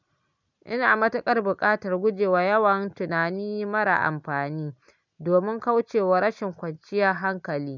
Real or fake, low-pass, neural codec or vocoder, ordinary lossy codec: real; 7.2 kHz; none; none